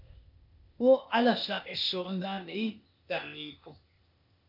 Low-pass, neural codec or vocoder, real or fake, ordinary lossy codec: 5.4 kHz; codec, 16 kHz, 0.8 kbps, ZipCodec; fake; MP3, 48 kbps